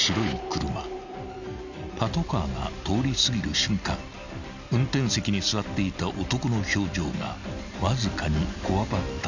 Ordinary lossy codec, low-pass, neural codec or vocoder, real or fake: none; 7.2 kHz; none; real